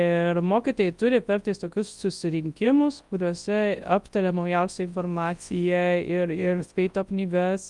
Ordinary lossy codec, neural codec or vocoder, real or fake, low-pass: Opus, 32 kbps; codec, 24 kHz, 0.9 kbps, WavTokenizer, large speech release; fake; 10.8 kHz